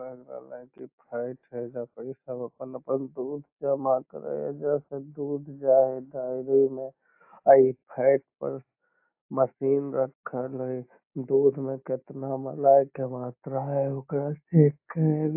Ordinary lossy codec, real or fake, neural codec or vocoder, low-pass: AAC, 32 kbps; real; none; 3.6 kHz